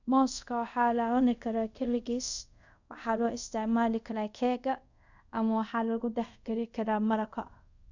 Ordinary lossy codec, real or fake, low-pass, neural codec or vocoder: none; fake; 7.2 kHz; codec, 24 kHz, 0.5 kbps, DualCodec